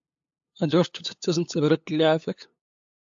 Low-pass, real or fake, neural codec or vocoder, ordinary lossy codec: 7.2 kHz; fake; codec, 16 kHz, 8 kbps, FunCodec, trained on LibriTTS, 25 frames a second; AAC, 64 kbps